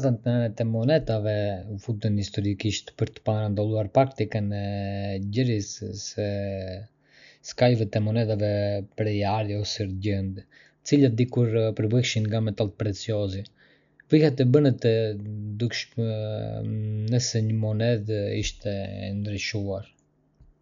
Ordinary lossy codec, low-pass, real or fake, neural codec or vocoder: none; 7.2 kHz; real; none